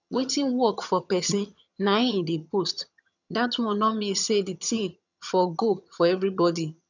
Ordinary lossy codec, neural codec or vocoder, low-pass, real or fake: none; vocoder, 22.05 kHz, 80 mel bands, HiFi-GAN; 7.2 kHz; fake